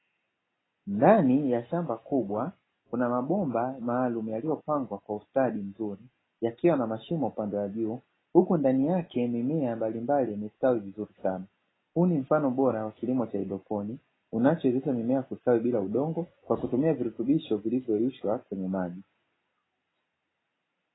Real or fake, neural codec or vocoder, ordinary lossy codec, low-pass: real; none; AAC, 16 kbps; 7.2 kHz